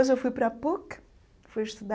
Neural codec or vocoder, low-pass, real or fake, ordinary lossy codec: none; none; real; none